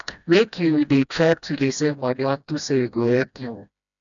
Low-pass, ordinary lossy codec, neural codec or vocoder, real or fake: 7.2 kHz; none; codec, 16 kHz, 1 kbps, FreqCodec, smaller model; fake